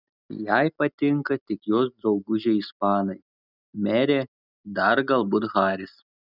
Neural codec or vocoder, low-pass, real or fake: none; 5.4 kHz; real